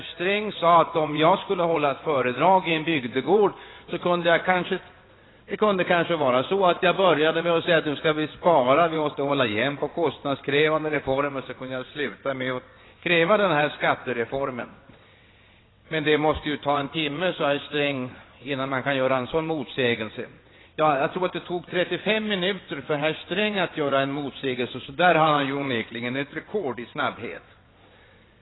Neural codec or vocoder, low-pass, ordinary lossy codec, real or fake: vocoder, 22.05 kHz, 80 mel bands, WaveNeXt; 7.2 kHz; AAC, 16 kbps; fake